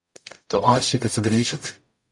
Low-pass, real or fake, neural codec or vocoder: 10.8 kHz; fake; codec, 44.1 kHz, 0.9 kbps, DAC